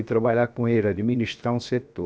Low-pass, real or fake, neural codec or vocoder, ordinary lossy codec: none; fake; codec, 16 kHz, 0.7 kbps, FocalCodec; none